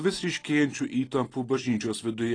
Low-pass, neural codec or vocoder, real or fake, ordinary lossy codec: 9.9 kHz; none; real; AAC, 32 kbps